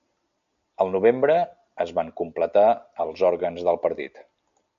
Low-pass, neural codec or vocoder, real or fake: 7.2 kHz; none; real